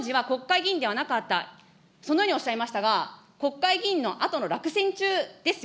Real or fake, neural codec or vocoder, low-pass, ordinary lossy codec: real; none; none; none